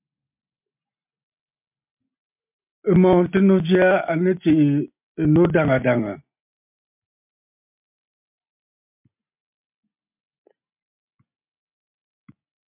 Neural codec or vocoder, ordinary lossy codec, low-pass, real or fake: none; MP3, 32 kbps; 3.6 kHz; real